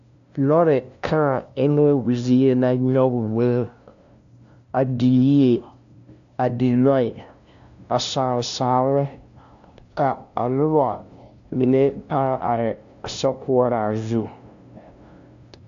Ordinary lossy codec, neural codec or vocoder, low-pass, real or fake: AAC, 64 kbps; codec, 16 kHz, 0.5 kbps, FunCodec, trained on LibriTTS, 25 frames a second; 7.2 kHz; fake